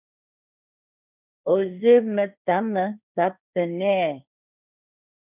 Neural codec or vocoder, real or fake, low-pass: codec, 16 kHz, 1.1 kbps, Voila-Tokenizer; fake; 3.6 kHz